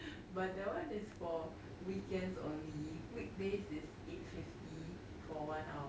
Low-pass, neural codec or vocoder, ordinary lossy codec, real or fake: none; none; none; real